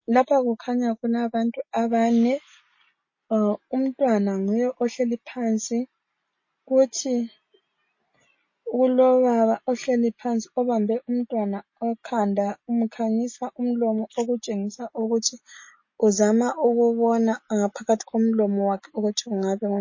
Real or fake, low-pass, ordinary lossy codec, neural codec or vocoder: real; 7.2 kHz; MP3, 32 kbps; none